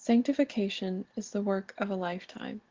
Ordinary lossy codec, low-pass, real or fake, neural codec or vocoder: Opus, 16 kbps; 7.2 kHz; real; none